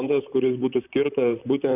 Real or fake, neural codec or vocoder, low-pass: fake; vocoder, 44.1 kHz, 128 mel bands, Pupu-Vocoder; 3.6 kHz